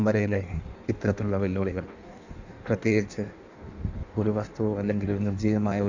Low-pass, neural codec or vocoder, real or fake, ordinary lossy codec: 7.2 kHz; codec, 16 kHz in and 24 kHz out, 1.1 kbps, FireRedTTS-2 codec; fake; none